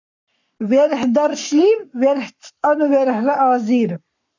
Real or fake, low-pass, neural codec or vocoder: fake; 7.2 kHz; codec, 44.1 kHz, 7.8 kbps, Pupu-Codec